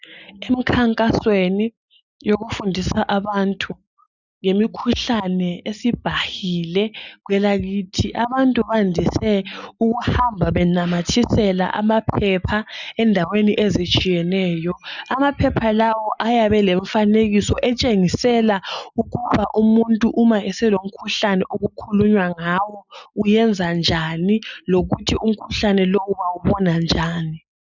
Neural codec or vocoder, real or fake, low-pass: none; real; 7.2 kHz